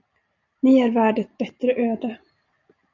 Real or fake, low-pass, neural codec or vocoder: real; 7.2 kHz; none